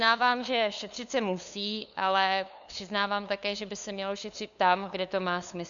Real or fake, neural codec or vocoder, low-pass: fake; codec, 16 kHz, 2 kbps, FunCodec, trained on LibriTTS, 25 frames a second; 7.2 kHz